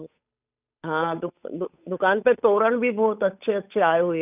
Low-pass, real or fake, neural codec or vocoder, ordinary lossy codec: 3.6 kHz; fake; codec, 16 kHz, 8 kbps, FunCodec, trained on Chinese and English, 25 frames a second; none